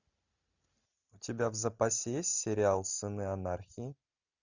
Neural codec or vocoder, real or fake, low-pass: none; real; 7.2 kHz